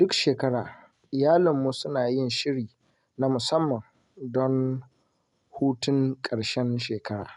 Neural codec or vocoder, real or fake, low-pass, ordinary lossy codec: none; real; 10.8 kHz; none